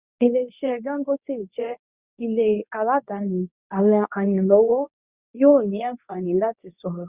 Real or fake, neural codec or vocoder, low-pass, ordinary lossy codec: fake; codec, 24 kHz, 0.9 kbps, WavTokenizer, medium speech release version 2; 3.6 kHz; Opus, 64 kbps